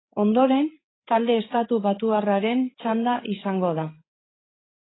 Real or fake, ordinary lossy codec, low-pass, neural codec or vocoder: fake; AAC, 16 kbps; 7.2 kHz; codec, 16 kHz, 8 kbps, FreqCodec, larger model